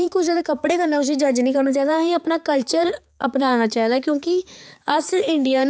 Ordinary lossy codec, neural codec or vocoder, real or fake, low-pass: none; codec, 16 kHz, 4 kbps, X-Codec, HuBERT features, trained on balanced general audio; fake; none